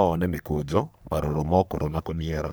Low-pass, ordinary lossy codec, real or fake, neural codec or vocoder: none; none; fake; codec, 44.1 kHz, 3.4 kbps, Pupu-Codec